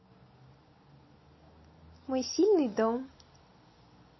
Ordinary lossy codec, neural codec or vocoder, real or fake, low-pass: MP3, 24 kbps; none; real; 7.2 kHz